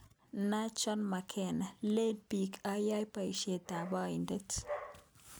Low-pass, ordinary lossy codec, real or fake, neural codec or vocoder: none; none; real; none